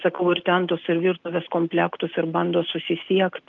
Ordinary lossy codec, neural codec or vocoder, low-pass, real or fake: Opus, 32 kbps; none; 7.2 kHz; real